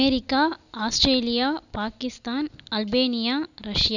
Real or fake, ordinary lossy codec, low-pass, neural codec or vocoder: real; none; 7.2 kHz; none